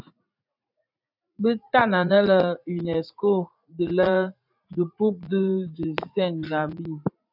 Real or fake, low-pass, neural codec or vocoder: fake; 5.4 kHz; vocoder, 44.1 kHz, 80 mel bands, Vocos